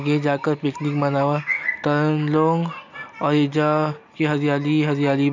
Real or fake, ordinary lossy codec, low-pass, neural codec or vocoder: real; none; 7.2 kHz; none